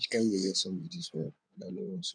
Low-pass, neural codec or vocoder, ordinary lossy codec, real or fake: 9.9 kHz; codec, 16 kHz in and 24 kHz out, 2.2 kbps, FireRedTTS-2 codec; none; fake